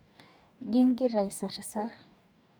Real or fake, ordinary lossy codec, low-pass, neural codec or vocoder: fake; none; none; codec, 44.1 kHz, 2.6 kbps, DAC